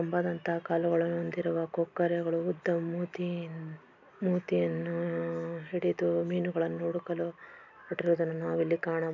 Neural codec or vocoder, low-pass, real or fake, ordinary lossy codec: none; 7.2 kHz; real; none